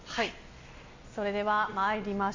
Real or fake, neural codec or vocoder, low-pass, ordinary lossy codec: real; none; 7.2 kHz; MP3, 48 kbps